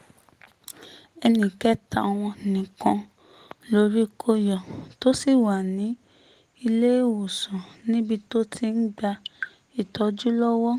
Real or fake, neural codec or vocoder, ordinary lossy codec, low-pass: real; none; Opus, 24 kbps; 14.4 kHz